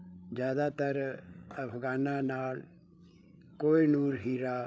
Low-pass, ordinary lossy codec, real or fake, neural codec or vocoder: none; none; fake; codec, 16 kHz, 8 kbps, FreqCodec, larger model